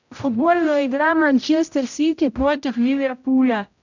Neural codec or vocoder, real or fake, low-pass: codec, 16 kHz, 0.5 kbps, X-Codec, HuBERT features, trained on general audio; fake; 7.2 kHz